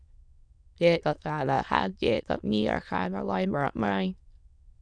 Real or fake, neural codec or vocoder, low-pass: fake; autoencoder, 22.05 kHz, a latent of 192 numbers a frame, VITS, trained on many speakers; 9.9 kHz